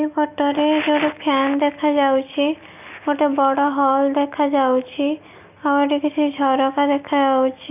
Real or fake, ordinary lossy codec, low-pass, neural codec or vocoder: real; none; 3.6 kHz; none